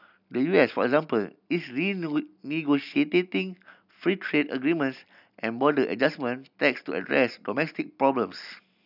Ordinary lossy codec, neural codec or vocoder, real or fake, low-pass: none; none; real; 5.4 kHz